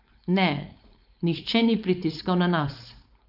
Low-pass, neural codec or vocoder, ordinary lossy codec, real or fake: 5.4 kHz; codec, 16 kHz, 4.8 kbps, FACodec; none; fake